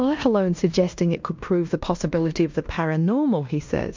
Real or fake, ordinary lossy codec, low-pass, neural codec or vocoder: fake; MP3, 64 kbps; 7.2 kHz; codec, 16 kHz in and 24 kHz out, 0.9 kbps, LongCat-Audio-Codec, fine tuned four codebook decoder